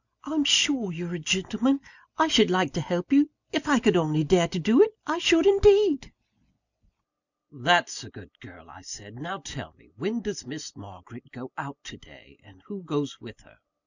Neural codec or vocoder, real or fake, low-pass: none; real; 7.2 kHz